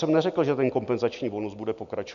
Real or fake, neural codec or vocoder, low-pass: real; none; 7.2 kHz